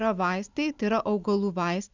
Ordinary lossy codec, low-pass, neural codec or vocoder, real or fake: Opus, 64 kbps; 7.2 kHz; none; real